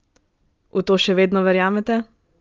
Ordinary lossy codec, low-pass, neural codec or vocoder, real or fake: Opus, 24 kbps; 7.2 kHz; none; real